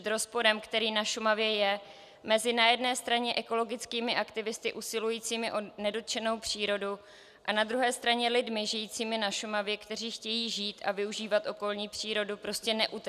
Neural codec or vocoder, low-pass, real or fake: none; 14.4 kHz; real